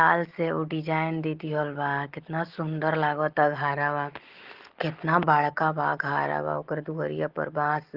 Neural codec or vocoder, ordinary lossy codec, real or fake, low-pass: none; Opus, 16 kbps; real; 5.4 kHz